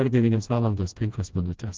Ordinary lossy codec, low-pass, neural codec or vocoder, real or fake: Opus, 24 kbps; 7.2 kHz; codec, 16 kHz, 1 kbps, FreqCodec, smaller model; fake